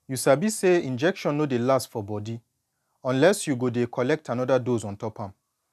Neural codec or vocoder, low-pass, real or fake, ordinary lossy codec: none; 14.4 kHz; real; none